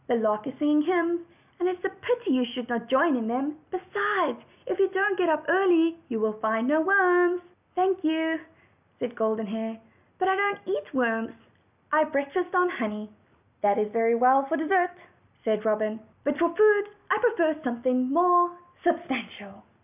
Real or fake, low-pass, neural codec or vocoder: real; 3.6 kHz; none